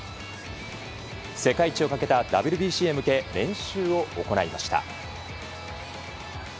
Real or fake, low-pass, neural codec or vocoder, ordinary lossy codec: real; none; none; none